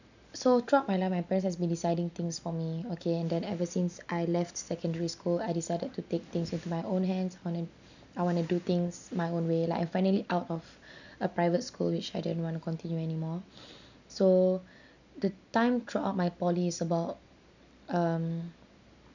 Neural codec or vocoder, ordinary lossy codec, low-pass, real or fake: none; none; 7.2 kHz; real